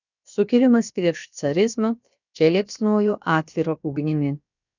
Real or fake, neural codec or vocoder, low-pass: fake; codec, 16 kHz, about 1 kbps, DyCAST, with the encoder's durations; 7.2 kHz